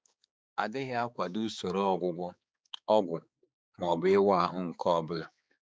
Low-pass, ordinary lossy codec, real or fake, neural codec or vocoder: none; none; fake; codec, 16 kHz, 4 kbps, X-Codec, HuBERT features, trained on balanced general audio